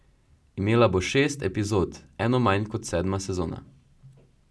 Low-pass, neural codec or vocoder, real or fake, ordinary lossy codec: none; none; real; none